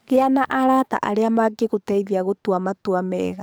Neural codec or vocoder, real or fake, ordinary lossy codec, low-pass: codec, 44.1 kHz, 7.8 kbps, DAC; fake; none; none